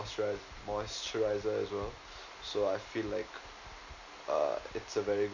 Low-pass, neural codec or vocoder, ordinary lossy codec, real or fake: 7.2 kHz; none; none; real